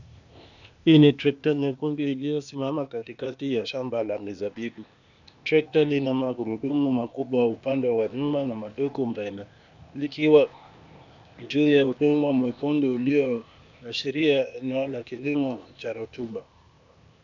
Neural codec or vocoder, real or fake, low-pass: codec, 16 kHz, 0.8 kbps, ZipCodec; fake; 7.2 kHz